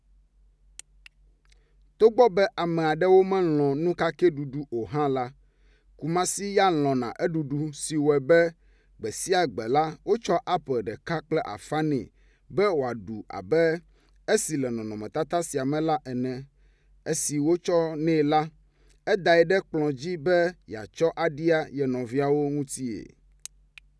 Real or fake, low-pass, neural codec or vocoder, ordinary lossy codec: real; none; none; none